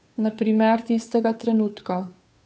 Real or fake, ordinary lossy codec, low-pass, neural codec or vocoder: fake; none; none; codec, 16 kHz, 2 kbps, FunCodec, trained on Chinese and English, 25 frames a second